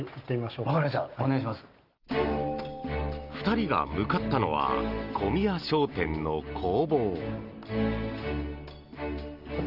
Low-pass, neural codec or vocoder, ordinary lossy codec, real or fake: 5.4 kHz; none; Opus, 32 kbps; real